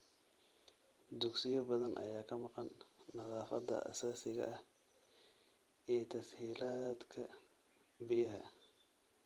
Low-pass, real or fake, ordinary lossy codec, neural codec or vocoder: 19.8 kHz; fake; Opus, 24 kbps; vocoder, 44.1 kHz, 128 mel bands every 512 samples, BigVGAN v2